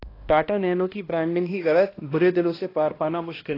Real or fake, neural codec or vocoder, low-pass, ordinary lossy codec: fake; codec, 16 kHz, 1 kbps, X-Codec, HuBERT features, trained on balanced general audio; 5.4 kHz; AAC, 24 kbps